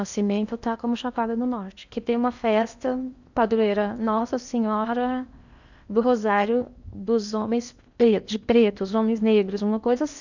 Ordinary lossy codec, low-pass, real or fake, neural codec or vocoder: none; 7.2 kHz; fake; codec, 16 kHz in and 24 kHz out, 0.8 kbps, FocalCodec, streaming, 65536 codes